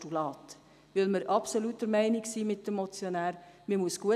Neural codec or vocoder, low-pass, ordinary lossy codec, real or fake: none; 14.4 kHz; none; real